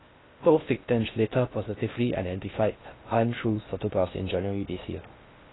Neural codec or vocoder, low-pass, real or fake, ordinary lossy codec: codec, 16 kHz in and 24 kHz out, 0.6 kbps, FocalCodec, streaming, 2048 codes; 7.2 kHz; fake; AAC, 16 kbps